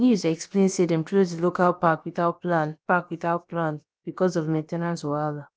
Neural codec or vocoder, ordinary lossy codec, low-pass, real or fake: codec, 16 kHz, about 1 kbps, DyCAST, with the encoder's durations; none; none; fake